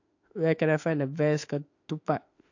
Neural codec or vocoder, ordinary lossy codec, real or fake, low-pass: autoencoder, 48 kHz, 32 numbers a frame, DAC-VAE, trained on Japanese speech; AAC, 48 kbps; fake; 7.2 kHz